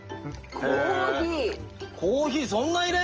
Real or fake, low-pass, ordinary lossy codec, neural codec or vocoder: real; 7.2 kHz; Opus, 24 kbps; none